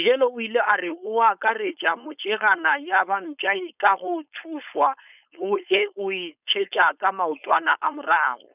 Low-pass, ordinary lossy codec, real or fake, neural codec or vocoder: 3.6 kHz; none; fake; codec, 16 kHz, 4.8 kbps, FACodec